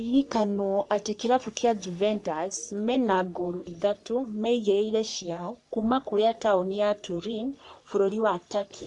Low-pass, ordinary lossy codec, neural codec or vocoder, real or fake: 10.8 kHz; none; codec, 44.1 kHz, 3.4 kbps, Pupu-Codec; fake